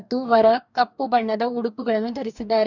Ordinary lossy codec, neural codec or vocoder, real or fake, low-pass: none; codec, 44.1 kHz, 2.6 kbps, DAC; fake; 7.2 kHz